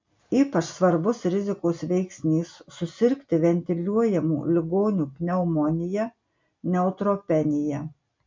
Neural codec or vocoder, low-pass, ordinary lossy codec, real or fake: none; 7.2 kHz; MP3, 64 kbps; real